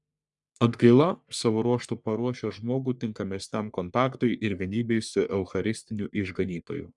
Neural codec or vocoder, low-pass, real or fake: codec, 44.1 kHz, 3.4 kbps, Pupu-Codec; 10.8 kHz; fake